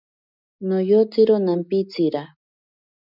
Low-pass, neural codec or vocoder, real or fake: 5.4 kHz; none; real